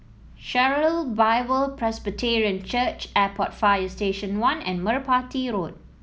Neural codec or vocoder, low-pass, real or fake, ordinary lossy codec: none; none; real; none